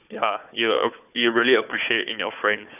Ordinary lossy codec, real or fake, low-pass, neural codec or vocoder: none; fake; 3.6 kHz; codec, 16 kHz, 4 kbps, FunCodec, trained on Chinese and English, 50 frames a second